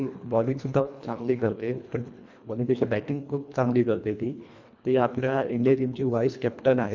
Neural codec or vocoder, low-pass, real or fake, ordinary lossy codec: codec, 24 kHz, 1.5 kbps, HILCodec; 7.2 kHz; fake; none